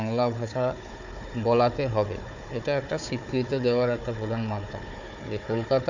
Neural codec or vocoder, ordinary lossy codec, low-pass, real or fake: codec, 16 kHz, 4 kbps, FunCodec, trained on Chinese and English, 50 frames a second; none; 7.2 kHz; fake